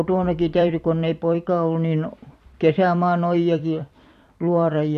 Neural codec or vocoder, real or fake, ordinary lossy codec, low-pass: vocoder, 44.1 kHz, 128 mel bands every 256 samples, BigVGAN v2; fake; none; 14.4 kHz